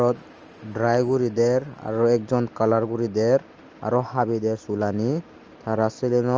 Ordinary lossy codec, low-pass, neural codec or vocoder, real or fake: Opus, 24 kbps; 7.2 kHz; none; real